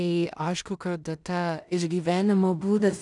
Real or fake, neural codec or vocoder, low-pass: fake; codec, 16 kHz in and 24 kHz out, 0.4 kbps, LongCat-Audio-Codec, two codebook decoder; 10.8 kHz